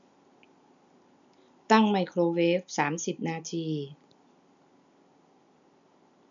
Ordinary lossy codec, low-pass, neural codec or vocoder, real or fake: none; 7.2 kHz; none; real